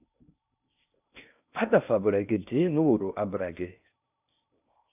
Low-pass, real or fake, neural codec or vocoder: 3.6 kHz; fake; codec, 16 kHz in and 24 kHz out, 0.6 kbps, FocalCodec, streaming, 4096 codes